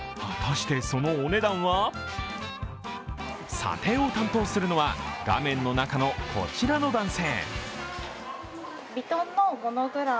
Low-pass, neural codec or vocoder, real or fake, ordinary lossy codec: none; none; real; none